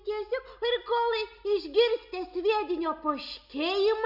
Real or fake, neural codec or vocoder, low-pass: real; none; 5.4 kHz